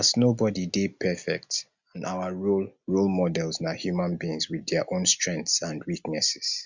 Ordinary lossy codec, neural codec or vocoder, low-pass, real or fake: Opus, 64 kbps; none; 7.2 kHz; real